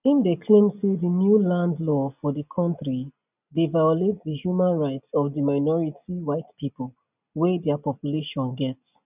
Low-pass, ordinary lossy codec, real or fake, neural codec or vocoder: 3.6 kHz; none; real; none